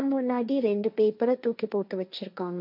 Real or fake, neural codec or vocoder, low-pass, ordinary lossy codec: fake; codec, 16 kHz, 1.1 kbps, Voila-Tokenizer; 5.4 kHz; none